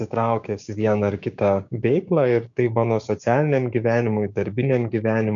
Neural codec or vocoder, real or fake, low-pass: codec, 16 kHz, 6 kbps, DAC; fake; 7.2 kHz